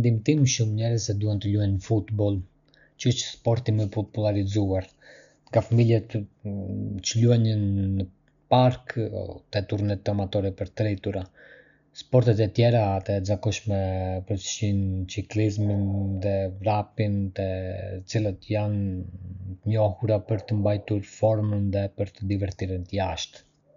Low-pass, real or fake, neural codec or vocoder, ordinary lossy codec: 7.2 kHz; real; none; none